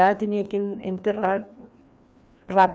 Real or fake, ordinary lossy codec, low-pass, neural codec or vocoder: fake; none; none; codec, 16 kHz, 2 kbps, FreqCodec, larger model